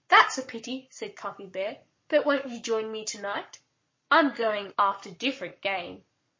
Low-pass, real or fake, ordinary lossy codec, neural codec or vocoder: 7.2 kHz; fake; MP3, 32 kbps; codec, 44.1 kHz, 7.8 kbps, Pupu-Codec